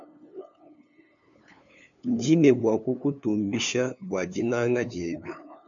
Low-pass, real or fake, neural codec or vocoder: 7.2 kHz; fake; codec, 16 kHz, 2 kbps, FunCodec, trained on LibriTTS, 25 frames a second